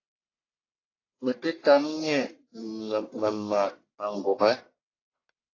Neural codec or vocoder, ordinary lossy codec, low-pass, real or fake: codec, 44.1 kHz, 1.7 kbps, Pupu-Codec; AAC, 32 kbps; 7.2 kHz; fake